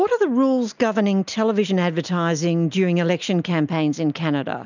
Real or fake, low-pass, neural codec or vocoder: real; 7.2 kHz; none